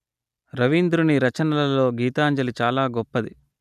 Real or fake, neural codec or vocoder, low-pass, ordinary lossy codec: real; none; 14.4 kHz; none